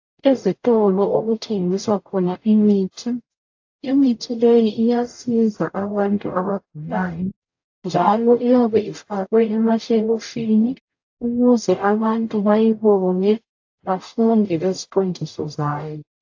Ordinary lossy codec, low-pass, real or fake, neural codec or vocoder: AAC, 48 kbps; 7.2 kHz; fake; codec, 44.1 kHz, 0.9 kbps, DAC